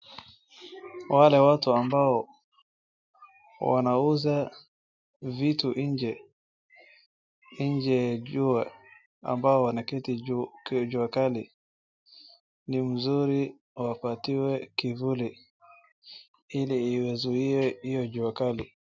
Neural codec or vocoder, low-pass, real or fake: none; 7.2 kHz; real